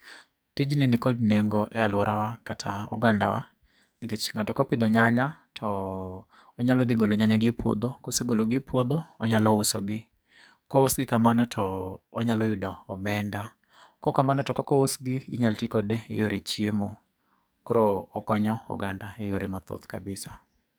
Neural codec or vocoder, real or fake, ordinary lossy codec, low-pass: codec, 44.1 kHz, 2.6 kbps, SNAC; fake; none; none